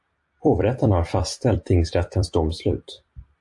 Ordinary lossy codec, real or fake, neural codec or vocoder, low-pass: AAC, 64 kbps; fake; vocoder, 44.1 kHz, 128 mel bands every 256 samples, BigVGAN v2; 10.8 kHz